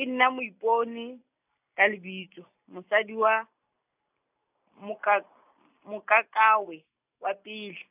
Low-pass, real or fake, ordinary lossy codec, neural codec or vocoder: 3.6 kHz; real; none; none